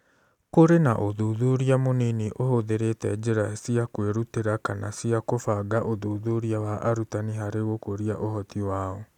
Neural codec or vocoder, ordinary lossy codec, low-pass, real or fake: none; none; 19.8 kHz; real